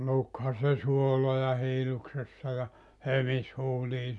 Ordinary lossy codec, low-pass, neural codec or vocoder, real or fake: none; 10.8 kHz; none; real